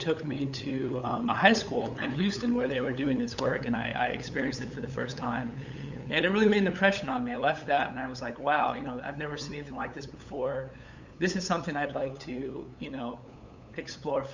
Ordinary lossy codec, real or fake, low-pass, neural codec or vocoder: Opus, 64 kbps; fake; 7.2 kHz; codec, 16 kHz, 8 kbps, FunCodec, trained on LibriTTS, 25 frames a second